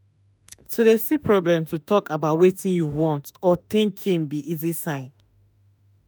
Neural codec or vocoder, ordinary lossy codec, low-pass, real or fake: autoencoder, 48 kHz, 32 numbers a frame, DAC-VAE, trained on Japanese speech; none; none; fake